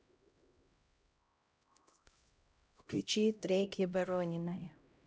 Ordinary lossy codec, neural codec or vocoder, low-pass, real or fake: none; codec, 16 kHz, 0.5 kbps, X-Codec, HuBERT features, trained on LibriSpeech; none; fake